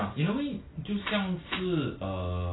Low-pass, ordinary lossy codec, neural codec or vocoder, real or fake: 7.2 kHz; AAC, 16 kbps; none; real